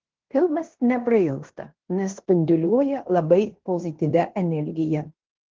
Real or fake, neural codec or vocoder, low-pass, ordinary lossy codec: fake; codec, 16 kHz in and 24 kHz out, 0.9 kbps, LongCat-Audio-Codec, fine tuned four codebook decoder; 7.2 kHz; Opus, 16 kbps